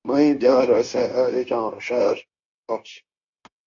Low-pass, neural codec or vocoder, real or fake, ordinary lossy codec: 7.2 kHz; codec, 16 kHz, 0.9 kbps, LongCat-Audio-Codec; fake; AAC, 64 kbps